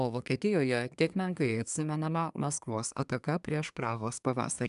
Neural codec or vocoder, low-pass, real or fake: codec, 24 kHz, 1 kbps, SNAC; 10.8 kHz; fake